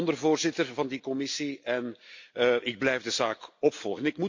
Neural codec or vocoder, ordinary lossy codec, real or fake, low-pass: none; MP3, 48 kbps; real; 7.2 kHz